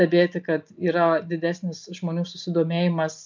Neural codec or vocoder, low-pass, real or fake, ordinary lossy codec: none; 7.2 kHz; real; MP3, 64 kbps